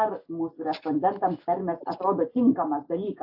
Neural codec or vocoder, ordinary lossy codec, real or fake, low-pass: none; AAC, 48 kbps; real; 5.4 kHz